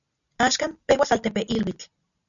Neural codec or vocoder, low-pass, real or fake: none; 7.2 kHz; real